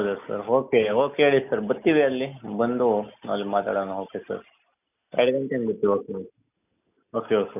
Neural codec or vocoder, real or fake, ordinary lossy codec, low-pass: none; real; none; 3.6 kHz